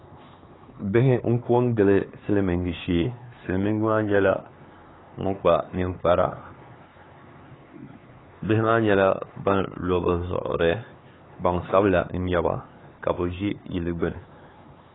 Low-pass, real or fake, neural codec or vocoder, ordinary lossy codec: 7.2 kHz; fake; codec, 16 kHz, 4 kbps, X-Codec, HuBERT features, trained on LibriSpeech; AAC, 16 kbps